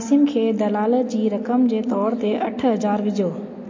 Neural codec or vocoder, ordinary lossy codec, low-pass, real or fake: none; MP3, 32 kbps; 7.2 kHz; real